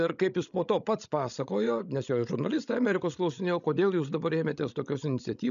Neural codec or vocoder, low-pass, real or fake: codec, 16 kHz, 16 kbps, FreqCodec, larger model; 7.2 kHz; fake